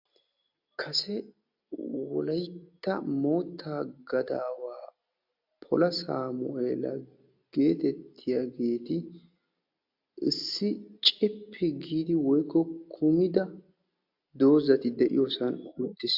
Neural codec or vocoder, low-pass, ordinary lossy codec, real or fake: none; 5.4 kHz; AAC, 48 kbps; real